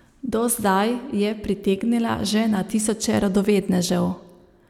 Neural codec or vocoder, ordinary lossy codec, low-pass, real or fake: none; none; 19.8 kHz; real